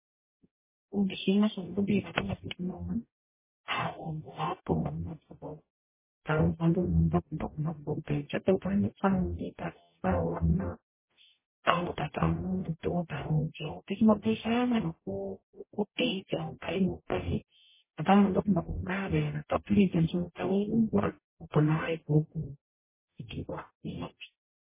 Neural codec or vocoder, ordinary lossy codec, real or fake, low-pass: codec, 44.1 kHz, 0.9 kbps, DAC; MP3, 16 kbps; fake; 3.6 kHz